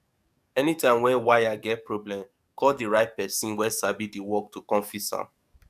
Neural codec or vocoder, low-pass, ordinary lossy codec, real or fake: codec, 44.1 kHz, 7.8 kbps, DAC; 14.4 kHz; none; fake